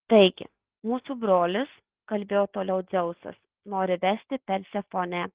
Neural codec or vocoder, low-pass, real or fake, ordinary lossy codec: none; 3.6 kHz; real; Opus, 16 kbps